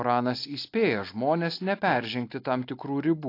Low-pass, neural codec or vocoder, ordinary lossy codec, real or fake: 5.4 kHz; none; AAC, 32 kbps; real